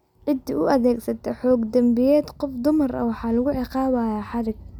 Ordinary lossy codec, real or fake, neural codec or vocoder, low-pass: none; real; none; 19.8 kHz